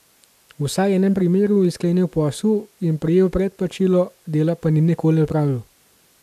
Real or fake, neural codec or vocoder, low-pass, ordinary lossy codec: real; none; 14.4 kHz; MP3, 96 kbps